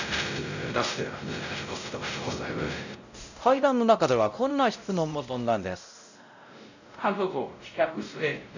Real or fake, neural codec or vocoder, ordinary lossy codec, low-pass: fake; codec, 16 kHz, 0.5 kbps, X-Codec, WavLM features, trained on Multilingual LibriSpeech; none; 7.2 kHz